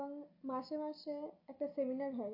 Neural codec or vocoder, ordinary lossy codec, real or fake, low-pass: none; MP3, 32 kbps; real; 5.4 kHz